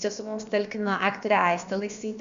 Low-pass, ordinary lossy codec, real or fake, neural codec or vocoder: 7.2 kHz; Opus, 64 kbps; fake; codec, 16 kHz, about 1 kbps, DyCAST, with the encoder's durations